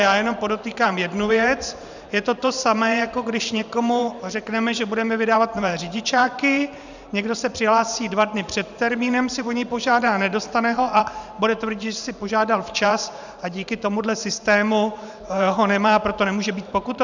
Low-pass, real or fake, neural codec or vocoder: 7.2 kHz; fake; vocoder, 44.1 kHz, 128 mel bands every 512 samples, BigVGAN v2